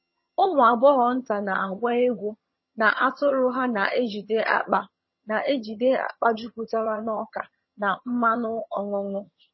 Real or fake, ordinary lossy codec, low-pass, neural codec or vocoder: fake; MP3, 24 kbps; 7.2 kHz; vocoder, 22.05 kHz, 80 mel bands, HiFi-GAN